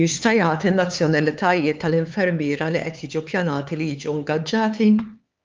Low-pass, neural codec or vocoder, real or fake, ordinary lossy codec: 7.2 kHz; codec, 16 kHz, 4 kbps, X-Codec, HuBERT features, trained on LibriSpeech; fake; Opus, 24 kbps